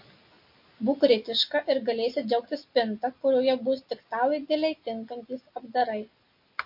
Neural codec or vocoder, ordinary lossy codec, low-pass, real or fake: none; MP3, 32 kbps; 5.4 kHz; real